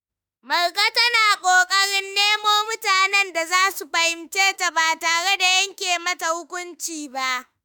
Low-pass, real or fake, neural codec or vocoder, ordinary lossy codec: none; fake; autoencoder, 48 kHz, 32 numbers a frame, DAC-VAE, trained on Japanese speech; none